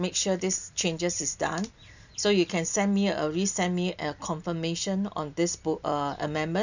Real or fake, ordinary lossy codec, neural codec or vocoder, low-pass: real; none; none; 7.2 kHz